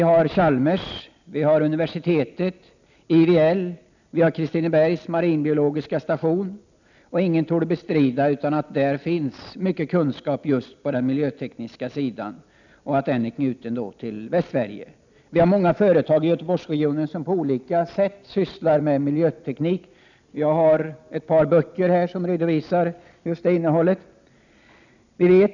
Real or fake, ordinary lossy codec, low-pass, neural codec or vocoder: real; none; 7.2 kHz; none